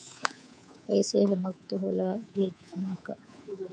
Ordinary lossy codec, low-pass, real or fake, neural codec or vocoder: MP3, 64 kbps; 9.9 kHz; fake; codec, 24 kHz, 3.1 kbps, DualCodec